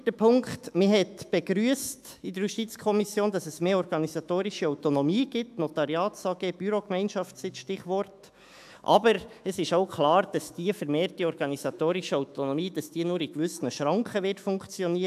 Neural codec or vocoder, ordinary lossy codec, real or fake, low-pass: autoencoder, 48 kHz, 128 numbers a frame, DAC-VAE, trained on Japanese speech; AAC, 96 kbps; fake; 14.4 kHz